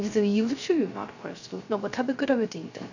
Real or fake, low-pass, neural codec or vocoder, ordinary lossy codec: fake; 7.2 kHz; codec, 16 kHz, 0.3 kbps, FocalCodec; none